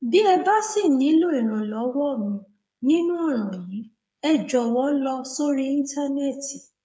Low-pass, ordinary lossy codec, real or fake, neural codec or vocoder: none; none; fake; codec, 16 kHz, 8 kbps, FreqCodec, smaller model